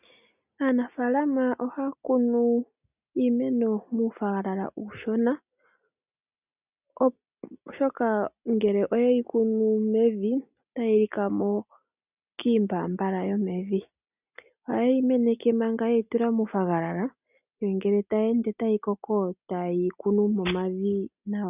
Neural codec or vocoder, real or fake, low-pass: none; real; 3.6 kHz